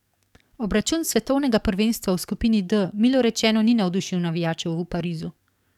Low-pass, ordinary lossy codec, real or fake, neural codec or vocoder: 19.8 kHz; none; fake; codec, 44.1 kHz, 7.8 kbps, DAC